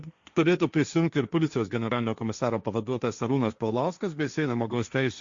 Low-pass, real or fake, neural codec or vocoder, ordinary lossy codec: 7.2 kHz; fake; codec, 16 kHz, 1.1 kbps, Voila-Tokenizer; Opus, 64 kbps